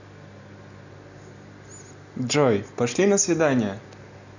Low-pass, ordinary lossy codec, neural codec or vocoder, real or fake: 7.2 kHz; none; none; real